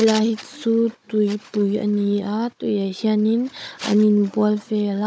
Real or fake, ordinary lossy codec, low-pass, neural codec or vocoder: fake; none; none; codec, 16 kHz, 16 kbps, FunCodec, trained on Chinese and English, 50 frames a second